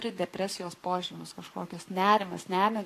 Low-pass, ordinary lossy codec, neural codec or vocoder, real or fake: 14.4 kHz; AAC, 64 kbps; codec, 44.1 kHz, 7.8 kbps, Pupu-Codec; fake